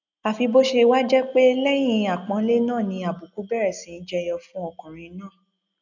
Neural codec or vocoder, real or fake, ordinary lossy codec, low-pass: none; real; none; 7.2 kHz